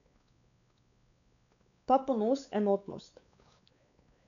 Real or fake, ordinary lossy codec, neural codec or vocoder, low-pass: fake; none; codec, 16 kHz, 4 kbps, X-Codec, WavLM features, trained on Multilingual LibriSpeech; 7.2 kHz